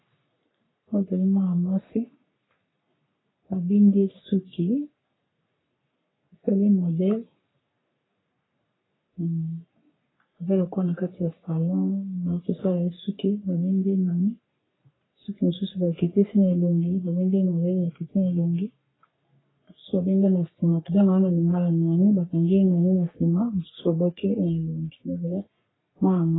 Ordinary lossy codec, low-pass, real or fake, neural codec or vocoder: AAC, 16 kbps; 7.2 kHz; fake; codec, 44.1 kHz, 3.4 kbps, Pupu-Codec